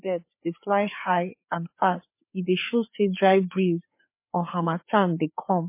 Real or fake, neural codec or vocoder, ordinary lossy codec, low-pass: fake; codec, 16 kHz, 4 kbps, FreqCodec, larger model; MP3, 24 kbps; 3.6 kHz